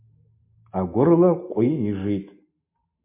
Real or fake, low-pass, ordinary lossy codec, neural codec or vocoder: real; 3.6 kHz; MP3, 24 kbps; none